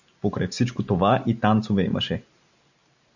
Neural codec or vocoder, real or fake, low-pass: none; real; 7.2 kHz